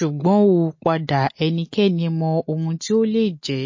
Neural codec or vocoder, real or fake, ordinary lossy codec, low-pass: none; real; MP3, 32 kbps; 7.2 kHz